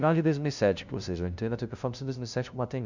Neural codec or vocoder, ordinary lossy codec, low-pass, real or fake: codec, 16 kHz, 0.5 kbps, FunCodec, trained on LibriTTS, 25 frames a second; none; 7.2 kHz; fake